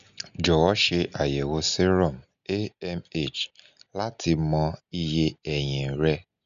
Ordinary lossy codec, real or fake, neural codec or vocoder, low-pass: none; real; none; 7.2 kHz